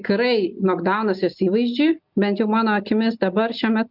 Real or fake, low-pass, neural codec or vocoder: real; 5.4 kHz; none